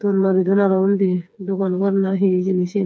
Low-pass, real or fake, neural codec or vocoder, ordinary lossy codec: none; fake; codec, 16 kHz, 4 kbps, FreqCodec, smaller model; none